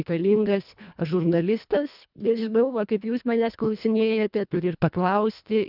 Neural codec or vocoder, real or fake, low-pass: codec, 24 kHz, 1.5 kbps, HILCodec; fake; 5.4 kHz